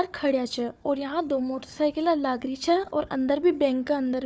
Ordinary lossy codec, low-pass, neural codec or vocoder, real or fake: none; none; codec, 16 kHz, 4 kbps, FunCodec, trained on Chinese and English, 50 frames a second; fake